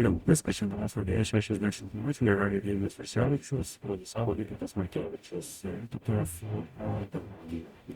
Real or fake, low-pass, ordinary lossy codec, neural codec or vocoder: fake; 19.8 kHz; Opus, 64 kbps; codec, 44.1 kHz, 0.9 kbps, DAC